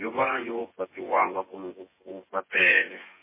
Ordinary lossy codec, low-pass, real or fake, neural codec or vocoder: MP3, 16 kbps; 3.6 kHz; fake; vocoder, 24 kHz, 100 mel bands, Vocos